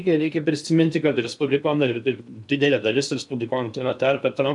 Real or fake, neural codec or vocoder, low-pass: fake; codec, 16 kHz in and 24 kHz out, 0.8 kbps, FocalCodec, streaming, 65536 codes; 10.8 kHz